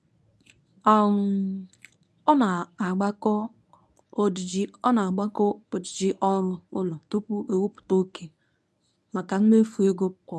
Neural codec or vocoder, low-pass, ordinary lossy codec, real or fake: codec, 24 kHz, 0.9 kbps, WavTokenizer, medium speech release version 1; none; none; fake